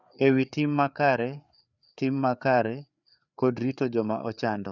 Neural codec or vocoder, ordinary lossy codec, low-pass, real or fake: codec, 16 kHz, 4 kbps, FreqCodec, larger model; none; 7.2 kHz; fake